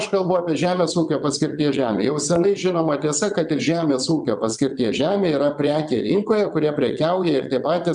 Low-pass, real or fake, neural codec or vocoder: 9.9 kHz; fake; vocoder, 22.05 kHz, 80 mel bands, WaveNeXt